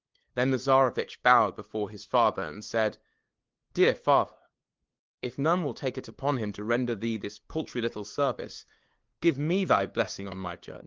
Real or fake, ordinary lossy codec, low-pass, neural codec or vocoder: fake; Opus, 16 kbps; 7.2 kHz; codec, 16 kHz, 2 kbps, FunCodec, trained on LibriTTS, 25 frames a second